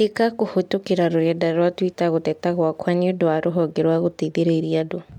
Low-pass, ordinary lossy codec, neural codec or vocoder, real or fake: 19.8 kHz; MP3, 96 kbps; none; real